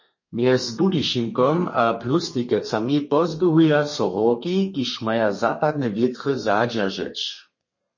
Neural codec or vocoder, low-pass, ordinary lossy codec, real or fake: codec, 32 kHz, 1.9 kbps, SNAC; 7.2 kHz; MP3, 32 kbps; fake